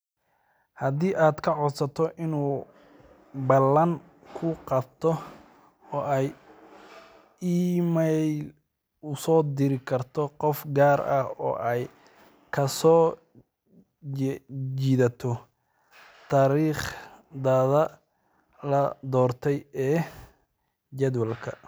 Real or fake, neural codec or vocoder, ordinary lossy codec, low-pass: real; none; none; none